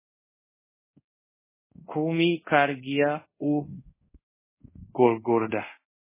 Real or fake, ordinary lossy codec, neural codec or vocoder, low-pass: fake; MP3, 16 kbps; codec, 24 kHz, 0.5 kbps, DualCodec; 3.6 kHz